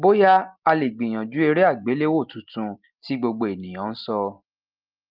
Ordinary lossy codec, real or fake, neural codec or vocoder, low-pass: Opus, 32 kbps; real; none; 5.4 kHz